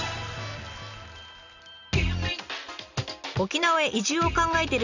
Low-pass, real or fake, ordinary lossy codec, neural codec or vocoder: 7.2 kHz; real; none; none